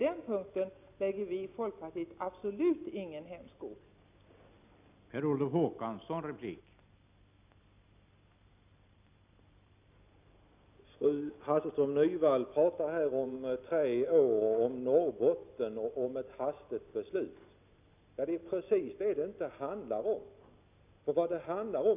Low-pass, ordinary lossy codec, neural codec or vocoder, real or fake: 3.6 kHz; none; none; real